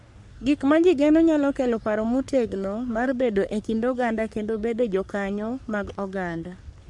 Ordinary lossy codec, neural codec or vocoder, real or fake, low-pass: none; codec, 44.1 kHz, 3.4 kbps, Pupu-Codec; fake; 10.8 kHz